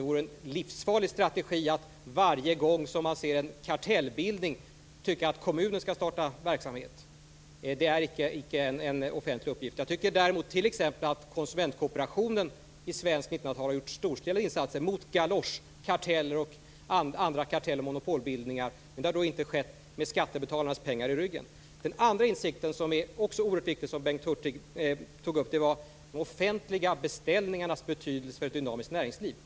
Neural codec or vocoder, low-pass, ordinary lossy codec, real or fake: none; none; none; real